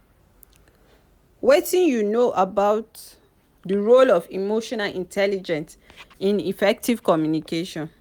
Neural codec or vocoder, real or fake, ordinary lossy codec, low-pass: none; real; none; none